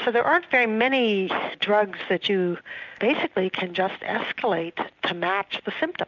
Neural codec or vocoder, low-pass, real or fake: none; 7.2 kHz; real